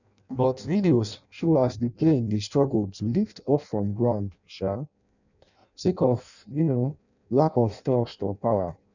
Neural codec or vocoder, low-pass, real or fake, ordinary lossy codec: codec, 16 kHz in and 24 kHz out, 0.6 kbps, FireRedTTS-2 codec; 7.2 kHz; fake; none